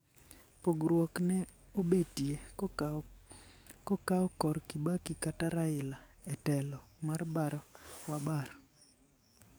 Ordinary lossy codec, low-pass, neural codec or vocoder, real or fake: none; none; codec, 44.1 kHz, 7.8 kbps, DAC; fake